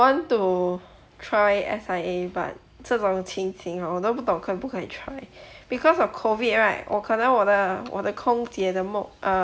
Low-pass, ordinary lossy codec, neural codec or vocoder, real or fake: none; none; none; real